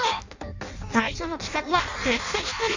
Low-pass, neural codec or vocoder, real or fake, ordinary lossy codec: 7.2 kHz; codec, 16 kHz in and 24 kHz out, 0.6 kbps, FireRedTTS-2 codec; fake; Opus, 64 kbps